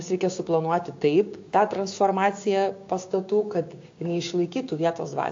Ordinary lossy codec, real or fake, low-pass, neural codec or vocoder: AAC, 48 kbps; fake; 7.2 kHz; codec, 16 kHz, 6 kbps, DAC